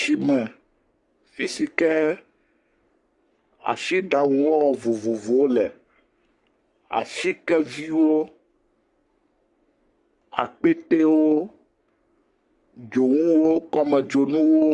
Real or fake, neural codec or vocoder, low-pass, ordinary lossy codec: fake; codec, 44.1 kHz, 3.4 kbps, Pupu-Codec; 10.8 kHz; Opus, 64 kbps